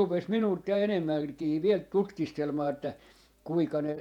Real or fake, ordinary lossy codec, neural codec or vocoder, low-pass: fake; none; vocoder, 48 kHz, 128 mel bands, Vocos; 19.8 kHz